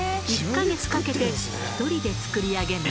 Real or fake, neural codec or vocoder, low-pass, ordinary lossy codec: real; none; none; none